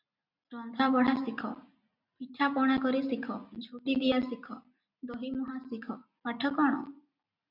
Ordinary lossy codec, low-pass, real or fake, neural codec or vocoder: MP3, 48 kbps; 5.4 kHz; real; none